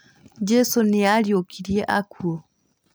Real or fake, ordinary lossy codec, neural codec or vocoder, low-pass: real; none; none; none